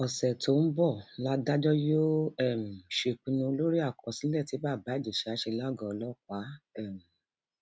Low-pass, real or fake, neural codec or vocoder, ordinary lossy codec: none; real; none; none